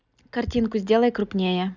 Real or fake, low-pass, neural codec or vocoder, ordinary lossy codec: real; 7.2 kHz; none; none